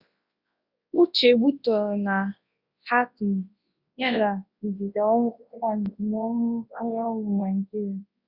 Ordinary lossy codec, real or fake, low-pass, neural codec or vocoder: Opus, 64 kbps; fake; 5.4 kHz; codec, 24 kHz, 0.9 kbps, WavTokenizer, large speech release